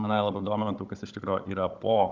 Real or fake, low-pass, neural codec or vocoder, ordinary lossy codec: fake; 7.2 kHz; codec, 16 kHz, 16 kbps, FunCodec, trained on Chinese and English, 50 frames a second; Opus, 24 kbps